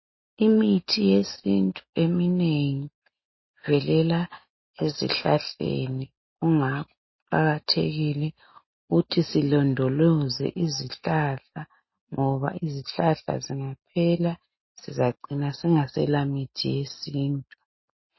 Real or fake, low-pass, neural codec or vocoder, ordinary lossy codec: real; 7.2 kHz; none; MP3, 24 kbps